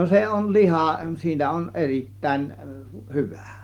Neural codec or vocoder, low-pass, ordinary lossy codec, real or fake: none; 19.8 kHz; Opus, 24 kbps; real